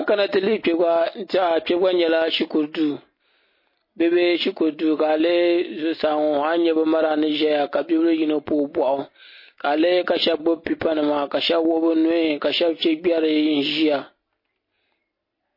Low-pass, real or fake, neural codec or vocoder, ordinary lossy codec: 5.4 kHz; real; none; MP3, 24 kbps